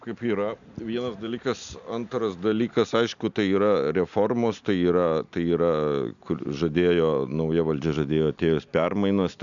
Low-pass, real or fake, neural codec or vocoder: 7.2 kHz; real; none